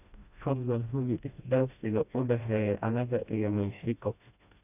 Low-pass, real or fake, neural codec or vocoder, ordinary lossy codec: 3.6 kHz; fake; codec, 16 kHz, 1 kbps, FreqCodec, smaller model; none